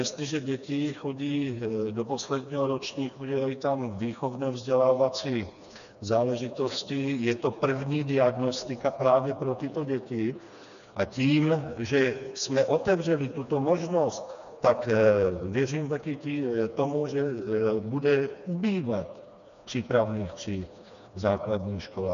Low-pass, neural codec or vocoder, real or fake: 7.2 kHz; codec, 16 kHz, 2 kbps, FreqCodec, smaller model; fake